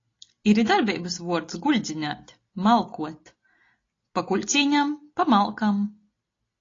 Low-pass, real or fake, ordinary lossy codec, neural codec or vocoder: 7.2 kHz; real; AAC, 32 kbps; none